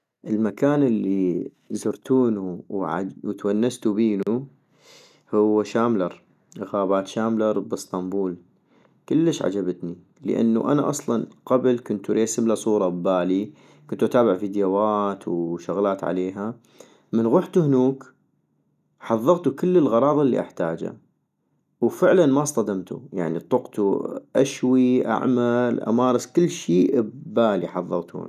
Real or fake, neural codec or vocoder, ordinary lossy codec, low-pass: real; none; none; 14.4 kHz